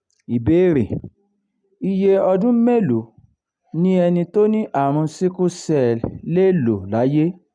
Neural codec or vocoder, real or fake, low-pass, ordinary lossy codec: none; real; 9.9 kHz; none